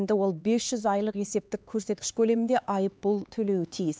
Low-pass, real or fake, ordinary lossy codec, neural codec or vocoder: none; fake; none; codec, 16 kHz, 4 kbps, X-Codec, HuBERT features, trained on LibriSpeech